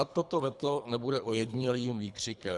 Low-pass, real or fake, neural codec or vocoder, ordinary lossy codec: 10.8 kHz; fake; codec, 24 kHz, 3 kbps, HILCodec; Opus, 64 kbps